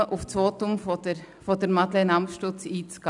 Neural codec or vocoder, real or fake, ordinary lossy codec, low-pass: none; real; none; 10.8 kHz